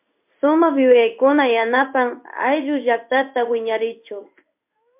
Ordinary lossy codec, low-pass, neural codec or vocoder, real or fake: MP3, 32 kbps; 3.6 kHz; codec, 16 kHz in and 24 kHz out, 1 kbps, XY-Tokenizer; fake